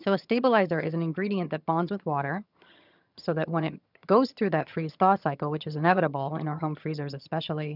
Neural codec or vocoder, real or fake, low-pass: vocoder, 22.05 kHz, 80 mel bands, HiFi-GAN; fake; 5.4 kHz